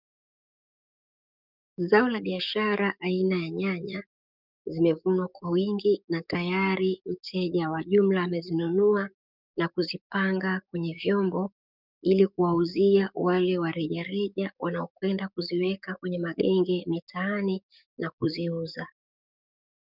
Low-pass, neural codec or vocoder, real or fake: 5.4 kHz; codec, 44.1 kHz, 7.8 kbps, DAC; fake